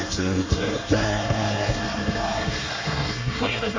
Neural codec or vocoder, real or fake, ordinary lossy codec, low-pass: codec, 24 kHz, 1 kbps, SNAC; fake; MP3, 64 kbps; 7.2 kHz